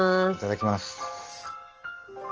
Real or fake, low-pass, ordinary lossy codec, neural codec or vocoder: real; 7.2 kHz; Opus, 16 kbps; none